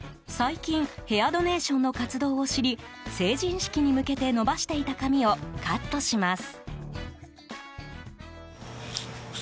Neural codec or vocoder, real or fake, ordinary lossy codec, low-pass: none; real; none; none